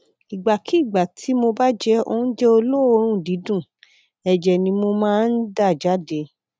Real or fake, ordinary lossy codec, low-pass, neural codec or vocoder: real; none; none; none